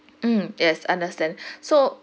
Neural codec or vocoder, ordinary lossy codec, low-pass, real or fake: none; none; none; real